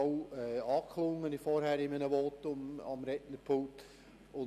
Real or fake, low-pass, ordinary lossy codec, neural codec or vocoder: real; 14.4 kHz; none; none